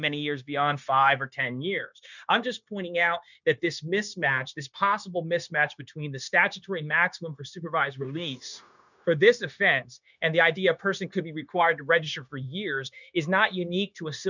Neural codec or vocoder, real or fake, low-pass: codec, 16 kHz, 0.9 kbps, LongCat-Audio-Codec; fake; 7.2 kHz